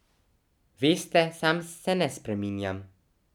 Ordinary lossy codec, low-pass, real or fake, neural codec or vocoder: none; 19.8 kHz; real; none